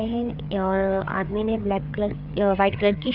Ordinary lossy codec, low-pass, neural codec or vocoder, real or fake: none; 5.4 kHz; codec, 16 kHz, 4 kbps, FreqCodec, larger model; fake